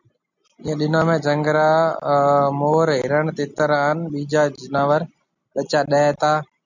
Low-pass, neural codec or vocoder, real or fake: 7.2 kHz; none; real